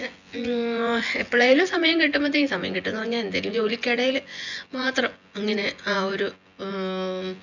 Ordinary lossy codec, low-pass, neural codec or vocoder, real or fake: none; 7.2 kHz; vocoder, 24 kHz, 100 mel bands, Vocos; fake